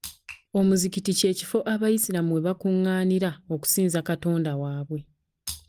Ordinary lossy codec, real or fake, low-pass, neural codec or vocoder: Opus, 32 kbps; real; 14.4 kHz; none